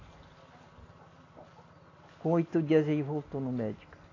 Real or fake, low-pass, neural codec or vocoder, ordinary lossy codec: real; 7.2 kHz; none; AAC, 32 kbps